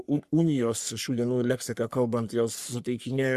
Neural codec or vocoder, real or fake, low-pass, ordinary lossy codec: codec, 44.1 kHz, 3.4 kbps, Pupu-Codec; fake; 14.4 kHz; Opus, 64 kbps